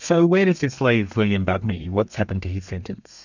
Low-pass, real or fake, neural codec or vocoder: 7.2 kHz; fake; codec, 32 kHz, 1.9 kbps, SNAC